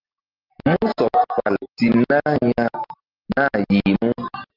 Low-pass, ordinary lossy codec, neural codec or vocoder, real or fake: 5.4 kHz; Opus, 24 kbps; none; real